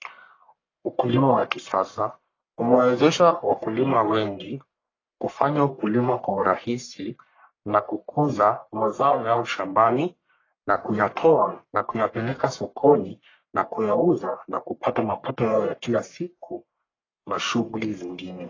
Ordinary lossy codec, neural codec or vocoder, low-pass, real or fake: AAC, 32 kbps; codec, 44.1 kHz, 1.7 kbps, Pupu-Codec; 7.2 kHz; fake